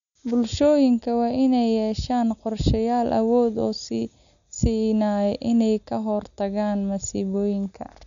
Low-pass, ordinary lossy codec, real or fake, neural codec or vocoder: 7.2 kHz; none; real; none